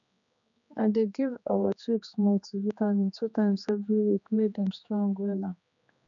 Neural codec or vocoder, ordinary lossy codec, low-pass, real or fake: codec, 16 kHz, 2 kbps, X-Codec, HuBERT features, trained on general audio; none; 7.2 kHz; fake